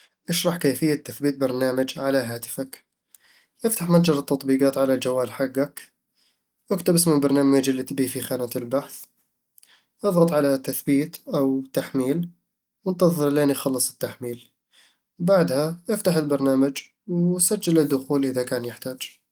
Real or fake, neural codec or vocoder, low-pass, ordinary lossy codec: real; none; 19.8 kHz; Opus, 24 kbps